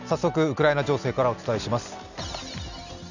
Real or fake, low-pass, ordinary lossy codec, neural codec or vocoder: real; 7.2 kHz; none; none